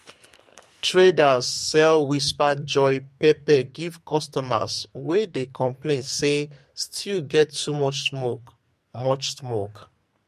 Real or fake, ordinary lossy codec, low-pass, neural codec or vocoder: fake; MP3, 64 kbps; 14.4 kHz; codec, 44.1 kHz, 2.6 kbps, SNAC